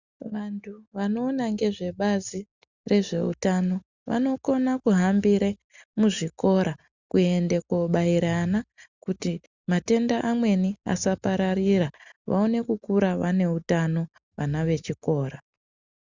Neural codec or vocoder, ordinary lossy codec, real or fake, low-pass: none; Opus, 64 kbps; real; 7.2 kHz